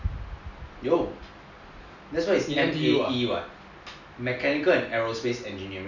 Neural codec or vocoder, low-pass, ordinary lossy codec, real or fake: none; 7.2 kHz; none; real